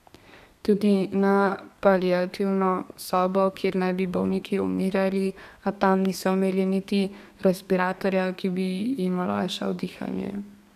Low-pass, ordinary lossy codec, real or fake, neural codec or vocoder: 14.4 kHz; none; fake; codec, 32 kHz, 1.9 kbps, SNAC